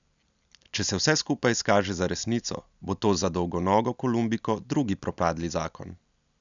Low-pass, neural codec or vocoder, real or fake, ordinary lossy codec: 7.2 kHz; none; real; none